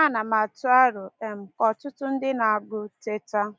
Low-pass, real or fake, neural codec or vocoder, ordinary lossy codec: none; real; none; none